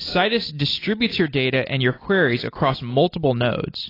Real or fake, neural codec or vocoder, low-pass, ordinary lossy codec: real; none; 5.4 kHz; AAC, 24 kbps